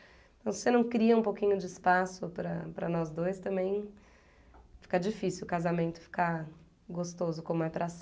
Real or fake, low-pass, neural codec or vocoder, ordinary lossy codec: real; none; none; none